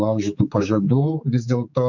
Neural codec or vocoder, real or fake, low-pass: codec, 16 kHz, 4 kbps, X-Codec, HuBERT features, trained on general audio; fake; 7.2 kHz